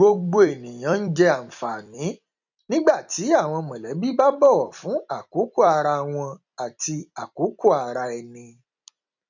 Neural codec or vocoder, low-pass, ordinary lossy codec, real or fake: vocoder, 44.1 kHz, 128 mel bands every 256 samples, BigVGAN v2; 7.2 kHz; none; fake